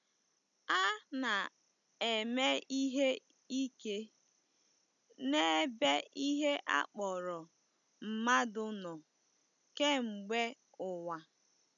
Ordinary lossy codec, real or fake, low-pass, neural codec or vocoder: none; real; 7.2 kHz; none